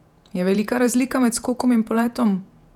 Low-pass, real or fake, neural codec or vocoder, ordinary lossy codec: 19.8 kHz; fake; vocoder, 48 kHz, 128 mel bands, Vocos; none